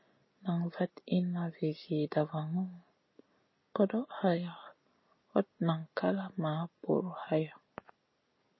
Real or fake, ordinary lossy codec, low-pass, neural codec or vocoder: real; MP3, 24 kbps; 7.2 kHz; none